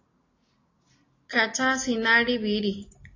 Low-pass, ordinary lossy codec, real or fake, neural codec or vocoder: 7.2 kHz; AAC, 32 kbps; real; none